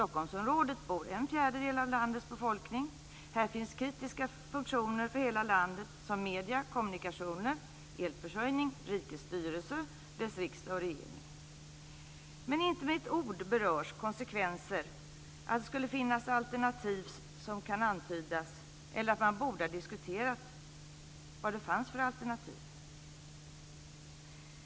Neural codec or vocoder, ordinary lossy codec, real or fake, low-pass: none; none; real; none